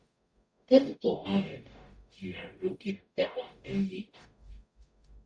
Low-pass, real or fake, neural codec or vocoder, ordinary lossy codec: 9.9 kHz; fake; codec, 44.1 kHz, 0.9 kbps, DAC; Opus, 64 kbps